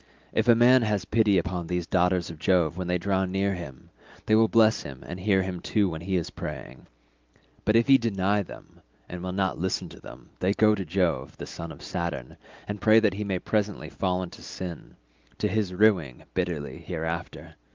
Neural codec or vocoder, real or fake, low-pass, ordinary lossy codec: none; real; 7.2 kHz; Opus, 24 kbps